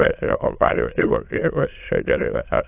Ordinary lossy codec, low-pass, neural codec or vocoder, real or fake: AAC, 32 kbps; 3.6 kHz; autoencoder, 22.05 kHz, a latent of 192 numbers a frame, VITS, trained on many speakers; fake